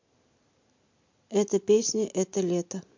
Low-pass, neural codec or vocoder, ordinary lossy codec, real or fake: 7.2 kHz; none; MP3, 48 kbps; real